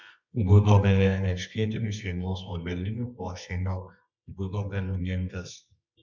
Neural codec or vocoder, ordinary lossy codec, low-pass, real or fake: codec, 24 kHz, 0.9 kbps, WavTokenizer, medium music audio release; MP3, 64 kbps; 7.2 kHz; fake